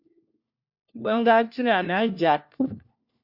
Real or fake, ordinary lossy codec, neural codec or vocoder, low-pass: fake; Opus, 64 kbps; codec, 16 kHz, 1 kbps, FunCodec, trained on LibriTTS, 50 frames a second; 5.4 kHz